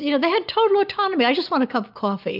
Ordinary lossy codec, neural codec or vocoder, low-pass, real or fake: AAC, 48 kbps; none; 5.4 kHz; real